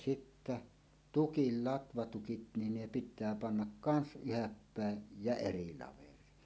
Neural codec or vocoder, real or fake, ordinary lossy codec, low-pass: none; real; none; none